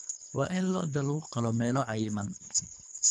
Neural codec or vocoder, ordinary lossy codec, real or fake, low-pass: codec, 24 kHz, 3 kbps, HILCodec; none; fake; none